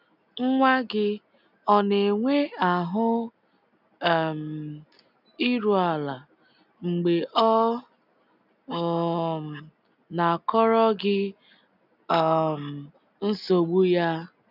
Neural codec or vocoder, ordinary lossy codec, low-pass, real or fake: none; none; 5.4 kHz; real